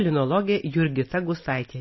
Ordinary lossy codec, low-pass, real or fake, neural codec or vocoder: MP3, 24 kbps; 7.2 kHz; real; none